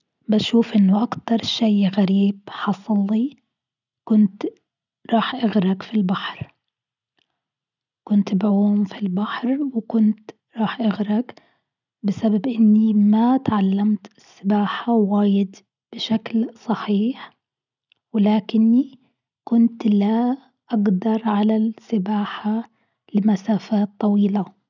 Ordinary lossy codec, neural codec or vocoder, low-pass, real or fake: none; none; 7.2 kHz; real